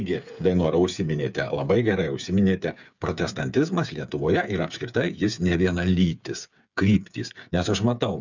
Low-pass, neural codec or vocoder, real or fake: 7.2 kHz; codec, 16 kHz, 8 kbps, FreqCodec, smaller model; fake